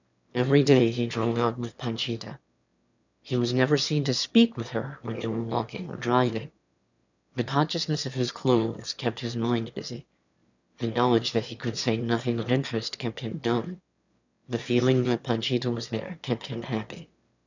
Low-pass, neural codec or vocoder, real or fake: 7.2 kHz; autoencoder, 22.05 kHz, a latent of 192 numbers a frame, VITS, trained on one speaker; fake